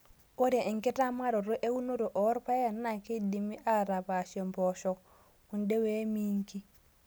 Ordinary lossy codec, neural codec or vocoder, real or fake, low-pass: none; none; real; none